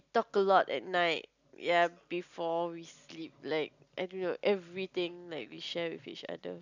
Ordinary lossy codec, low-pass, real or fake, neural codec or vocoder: none; 7.2 kHz; real; none